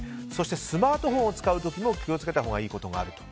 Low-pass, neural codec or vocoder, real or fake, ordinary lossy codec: none; none; real; none